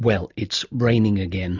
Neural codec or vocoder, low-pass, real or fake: none; 7.2 kHz; real